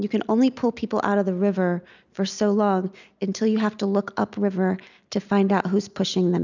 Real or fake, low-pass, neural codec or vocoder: real; 7.2 kHz; none